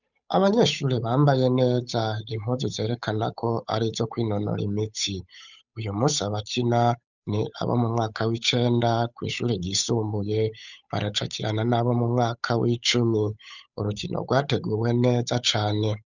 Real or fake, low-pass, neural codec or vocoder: fake; 7.2 kHz; codec, 16 kHz, 8 kbps, FunCodec, trained on Chinese and English, 25 frames a second